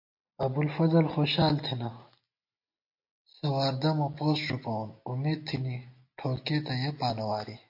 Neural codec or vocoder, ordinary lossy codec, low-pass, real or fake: none; MP3, 32 kbps; 5.4 kHz; real